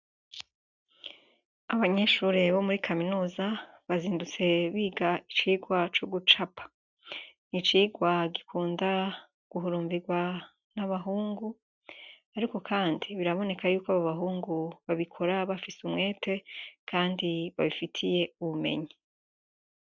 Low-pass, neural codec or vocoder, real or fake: 7.2 kHz; none; real